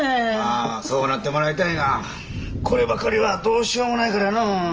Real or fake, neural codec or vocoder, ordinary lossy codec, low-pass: real; none; Opus, 24 kbps; 7.2 kHz